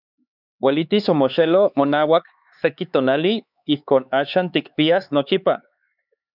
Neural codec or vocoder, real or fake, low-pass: codec, 16 kHz, 4 kbps, X-Codec, HuBERT features, trained on LibriSpeech; fake; 5.4 kHz